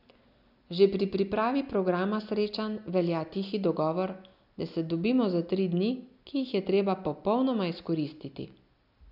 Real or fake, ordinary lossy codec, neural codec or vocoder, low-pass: real; none; none; 5.4 kHz